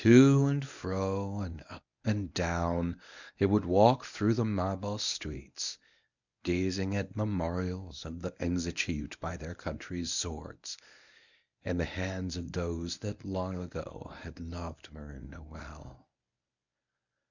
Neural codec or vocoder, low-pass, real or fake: codec, 24 kHz, 0.9 kbps, WavTokenizer, medium speech release version 1; 7.2 kHz; fake